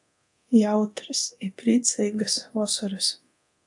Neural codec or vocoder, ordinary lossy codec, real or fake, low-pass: codec, 24 kHz, 0.9 kbps, DualCodec; AAC, 64 kbps; fake; 10.8 kHz